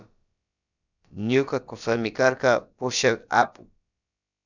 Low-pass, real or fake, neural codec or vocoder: 7.2 kHz; fake; codec, 16 kHz, about 1 kbps, DyCAST, with the encoder's durations